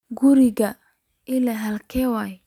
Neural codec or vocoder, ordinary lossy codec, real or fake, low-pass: none; none; real; 19.8 kHz